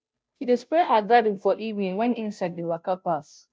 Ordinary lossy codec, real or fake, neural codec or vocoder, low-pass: none; fake; codec, 16 kHz, 0.5 kbps, FunCodec, trained on Chinese and English, 25 frames a second; none